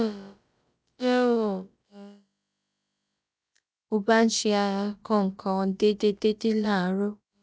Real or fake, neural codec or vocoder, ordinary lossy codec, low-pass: fake; codec, 16 kHz, about 1 kbps, DyCAST, with the encoder's durations; none; none